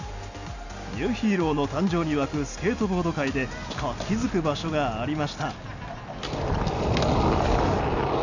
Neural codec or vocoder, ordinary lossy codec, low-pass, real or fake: none; none; 7.2 kHz; real